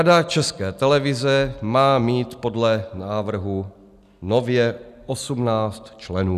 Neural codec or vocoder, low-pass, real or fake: none; 14.4 kHz; real